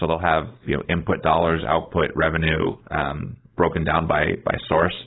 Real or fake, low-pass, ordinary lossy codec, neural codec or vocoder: fake; 7.2 kHz; AAC, 16 kbps; codec, 16 kHz, 4.8 kbps, FACodec